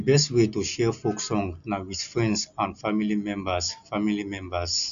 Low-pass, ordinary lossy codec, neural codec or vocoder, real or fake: 7.2 kHz; none; none; real